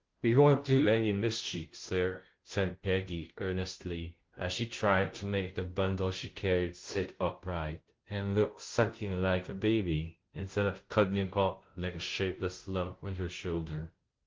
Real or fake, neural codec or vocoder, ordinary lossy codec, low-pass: fake; codec, 16 kHz, 0.5 kbps, FunCodec, trained on Chinese and English, 25 frames a second; Opus, 16 kbps; 7.2 kHz